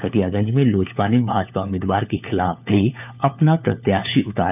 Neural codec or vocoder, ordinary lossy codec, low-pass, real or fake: codec, 16 kHz, 4 kbps, FunCodec, trained on LibriTTS, 50 frames a second; none; 3.6 kHz; fake